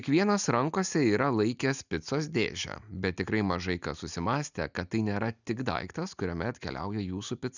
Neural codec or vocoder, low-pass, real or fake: none; 7.2 kHz; real